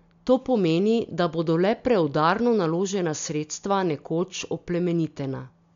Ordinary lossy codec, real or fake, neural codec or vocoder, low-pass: MP3, 64 kbps; real; none; 7.2 kHz